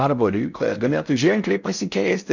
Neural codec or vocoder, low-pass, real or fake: codec, 16 kHz in and 24 kHz out, 0.6 kbps, FocalCodec, streaming, 4096 codes; 7.2 kHz; fake